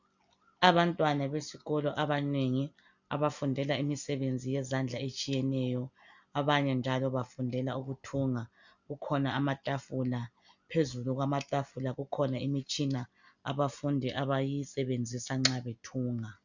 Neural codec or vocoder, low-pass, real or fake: none; 7.2 kHz; real